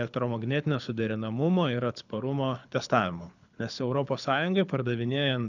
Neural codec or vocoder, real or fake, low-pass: codec, 24 kHz, 6 kbps, HILCodec; fake; 7.2 kHz